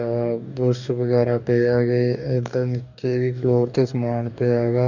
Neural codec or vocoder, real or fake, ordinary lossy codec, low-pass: codec, 44.1 kHz, 2.6 kbps, DAC; fake; none; 7.2 kHz